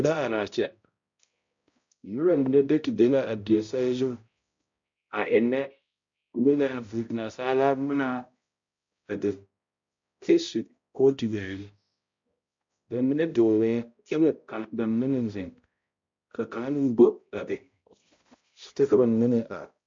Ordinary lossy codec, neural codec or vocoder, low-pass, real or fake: MP3, 48 kbps; codec, 16 kHz, 0.5 kbps, X-Codec, HuBERT features, trained on balanced general audio; 7.2 kHz; fake